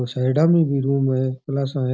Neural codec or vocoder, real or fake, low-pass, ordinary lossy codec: none; real; none; none